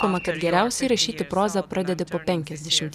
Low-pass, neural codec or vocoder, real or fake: 14.4 kHz; none; real